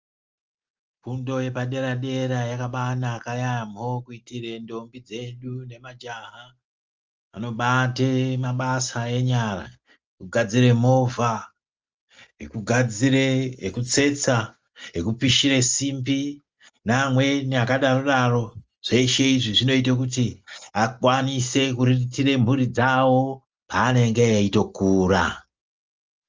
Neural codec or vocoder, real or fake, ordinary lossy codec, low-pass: none; real; Opus, 24 kbps; 7.2 kHz